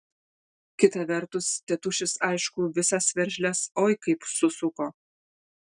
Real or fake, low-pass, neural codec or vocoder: real; 9.9 kHz; none